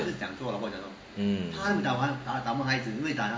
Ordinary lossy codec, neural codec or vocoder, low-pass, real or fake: none; none; none; real